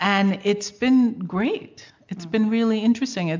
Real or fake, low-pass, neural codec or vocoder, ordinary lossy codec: real; 7.2 kHz; none; MP3, 64 kbps